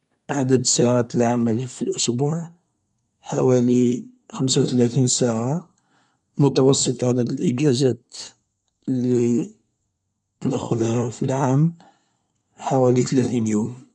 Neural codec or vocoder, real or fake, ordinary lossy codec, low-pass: codec, 24 kHz, 1 kbps, SNAC; fake; none; 10.8 kHz